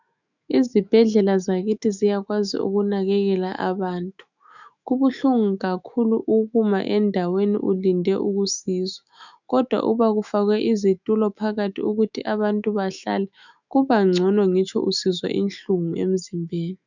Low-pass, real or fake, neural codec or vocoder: 7.2 kHz; fake; autoencoder, 48 kHz, 128 numbers a frame, DAC-VAE, trained on Japanese speech